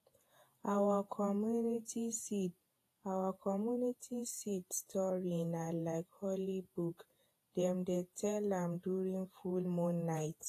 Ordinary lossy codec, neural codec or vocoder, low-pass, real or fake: AAC, 48 kbps; vocoder, 48 kHz, 128 mel bands, Vocos; 14.4 kHz; fake